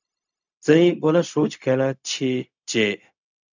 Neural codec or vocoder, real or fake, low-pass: codec, 16 kHz, 0.4 kbps, LongCat-Audio-Codec; fake; 7.2 kHz